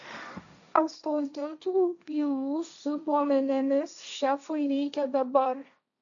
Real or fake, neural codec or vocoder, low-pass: fake; codec, 16 kHz, 1.1 kbps, Voila-Tokenizer; 7.2 kHz